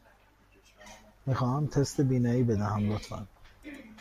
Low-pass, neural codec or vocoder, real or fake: 14.4 kHz; none; real